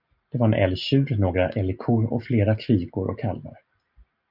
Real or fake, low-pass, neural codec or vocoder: real; 5.4 kHz; none